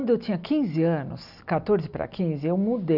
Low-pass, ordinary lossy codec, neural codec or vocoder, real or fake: 5.4 kHz; none; none; real